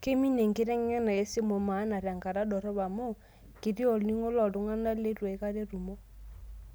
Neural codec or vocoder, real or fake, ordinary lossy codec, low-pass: none; real; none; none